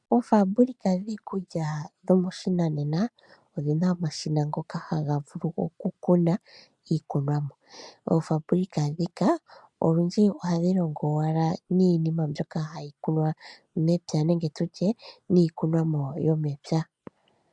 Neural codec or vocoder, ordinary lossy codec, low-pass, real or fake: none; MP3, 96 kbps; 10.8 kHz; real